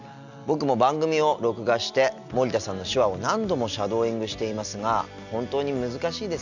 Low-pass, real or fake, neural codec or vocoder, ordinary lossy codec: 7.2 kHz; real; none; none